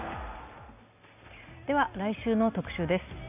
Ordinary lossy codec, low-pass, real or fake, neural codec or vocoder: none; 3.6 kHz; real; none